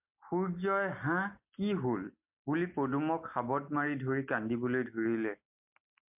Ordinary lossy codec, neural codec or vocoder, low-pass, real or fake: MP3, 32 kbps; none; 3.6 kHz; real